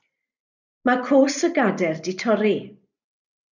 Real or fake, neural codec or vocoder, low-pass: real; none; 7.2 kHz